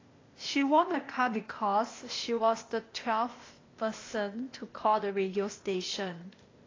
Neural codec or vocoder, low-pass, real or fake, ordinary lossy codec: codec, 16 kHz, 0.8 kbps, ZipCodec; 7.2 kHz; fake; AAC, 32 kbps